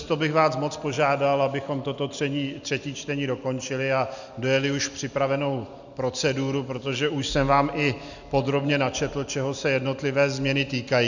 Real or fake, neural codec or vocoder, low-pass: real; none; 7.2 kHz